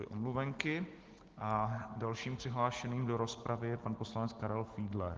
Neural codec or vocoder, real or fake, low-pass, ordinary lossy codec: none; real; 7.2 kHz; Opus, 16 kbps